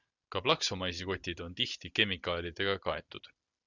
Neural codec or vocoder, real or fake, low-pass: none; real; 7.2 kHz